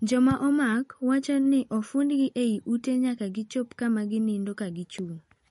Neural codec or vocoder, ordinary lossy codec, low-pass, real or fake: none; MP3, 48 kbps; 19.8 kHz; real